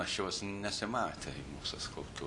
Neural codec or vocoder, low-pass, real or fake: none; 10.8 kHz; real